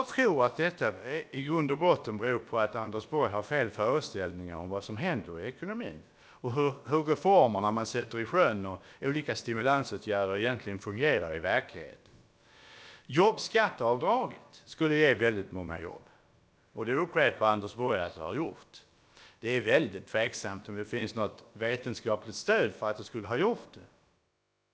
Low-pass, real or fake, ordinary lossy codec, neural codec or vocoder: none; fake; none; codec, 16 kHz, about 1 kbps, DyCAST, with the encoder's durations